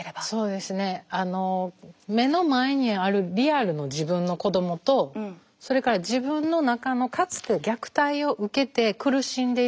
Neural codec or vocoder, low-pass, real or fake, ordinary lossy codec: none; none; real; none